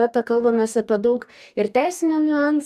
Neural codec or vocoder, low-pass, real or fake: codec, 44.1 kHz, 2.6 kbps, DAC; 14.4 kHz; fake